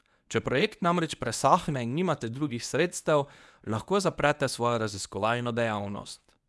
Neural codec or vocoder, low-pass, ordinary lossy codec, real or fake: codec, 24 kHz, 0.9 kbps, WavTokenizer, medium speech release version 1; none; none; fake